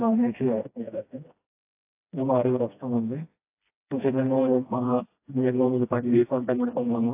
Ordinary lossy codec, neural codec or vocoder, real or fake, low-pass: AAC, 24 kbps; codec, 16 kHz, 1 kbps, FreqCodec, smaller model; fake; 3.6 kHz